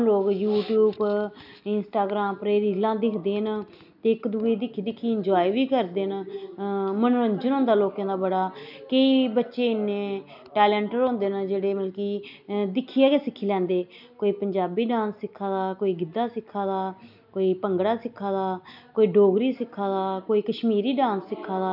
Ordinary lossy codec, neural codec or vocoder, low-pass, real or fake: none; none; 5.4 kHz; real